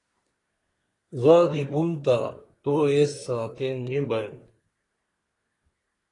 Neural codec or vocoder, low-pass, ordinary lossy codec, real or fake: codec, 24 kHz, 1 kbps, SNAC; 10.8 kHz; AAC, 32 kbps; fake